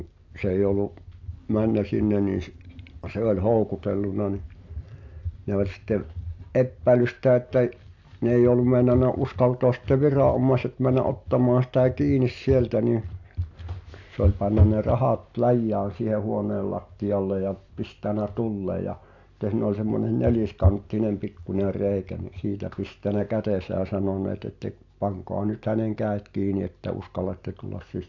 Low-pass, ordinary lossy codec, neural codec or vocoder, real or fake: 7.2 kHz; AAC, 48 kbps; none; real